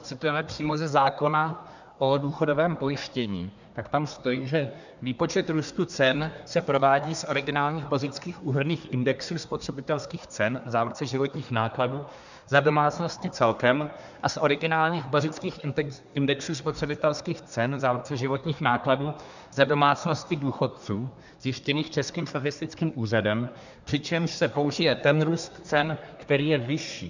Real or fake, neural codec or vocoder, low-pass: fake; codec, 24 kHz, 1 kbps, SNAC; 7.2 kHz